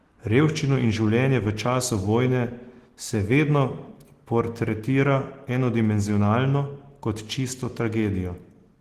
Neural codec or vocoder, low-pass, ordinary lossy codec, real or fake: none; 14.4 kHz; Opus, 16 kbps; real